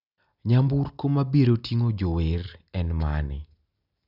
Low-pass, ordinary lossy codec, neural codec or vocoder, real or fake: 5.4 kHz; none; none; real